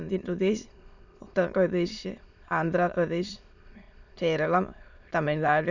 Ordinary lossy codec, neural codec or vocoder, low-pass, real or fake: none; autoencoder, 22.05 kHz, a latent of 192 numbers a frame, VITS, trained on many speakers; 7.2 kHz; fake